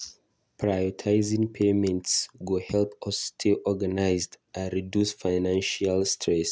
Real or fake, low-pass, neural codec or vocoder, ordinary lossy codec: real; none; none; none